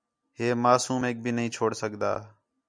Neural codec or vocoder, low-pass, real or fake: none; 9.9 kHz; real